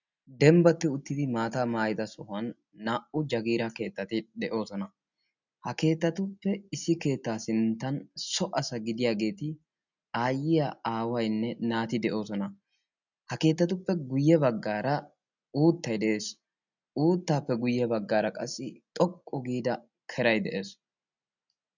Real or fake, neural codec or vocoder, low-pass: real; none; 7.2 kHz